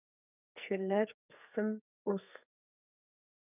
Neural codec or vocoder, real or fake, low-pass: codec, 44.1 kHz, 2.6 kbps, SNAC; fake; 3.6 kHz